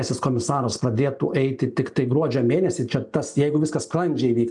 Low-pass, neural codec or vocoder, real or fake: 10.8 kHz; none; real